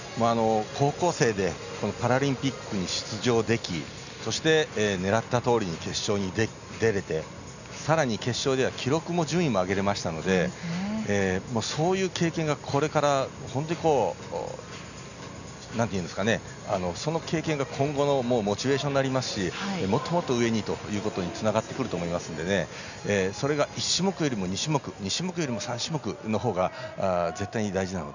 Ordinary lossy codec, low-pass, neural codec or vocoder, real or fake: none; 7.2 kHz; none; real